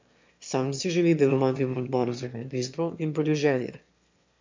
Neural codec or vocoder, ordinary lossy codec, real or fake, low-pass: autoencoder, 22.05 kHz, a latent of 192 numbers a frame, VITS, trained on one speaker; MP3, 64 kbps; fake; 7.2 kHz